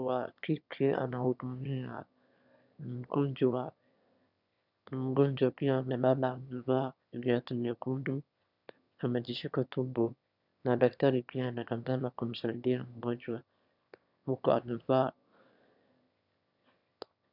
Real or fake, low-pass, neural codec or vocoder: fake; 5.4 kHz; autoencoder, 22.05 kHz, a latent of 192 numbers a frame, VITS, trained on one speaker